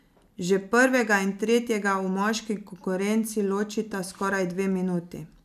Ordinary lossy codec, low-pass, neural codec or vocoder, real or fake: none; 14.4 kHz; none; real